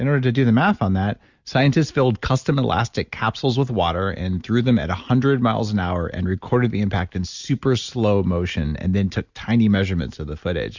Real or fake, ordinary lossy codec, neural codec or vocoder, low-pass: real; Opus, 64 kbps; none; 7.2 kHz